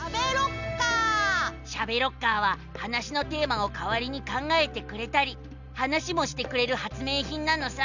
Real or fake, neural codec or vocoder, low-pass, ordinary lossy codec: real; none; 7.2 kHz; none